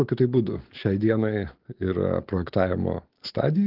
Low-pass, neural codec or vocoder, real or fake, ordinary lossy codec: 5.4 kHz; none; real; Opus, 16 kbps